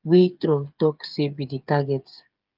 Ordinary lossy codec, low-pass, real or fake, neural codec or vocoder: Opus, 24 kbps; 5.4 kHz; fake; codec, 16 kHz, 8 kbps, FreqCodec, smaller model